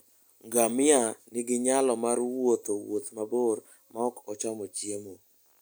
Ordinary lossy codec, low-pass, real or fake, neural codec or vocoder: none; none; real; none